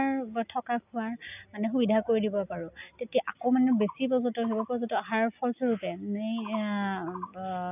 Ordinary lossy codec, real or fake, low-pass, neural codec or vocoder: none; real; 3.6 kHz; none